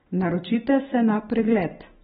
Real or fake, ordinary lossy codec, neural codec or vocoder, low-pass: real; AAC, 16 kbps; none; 10.8 kHz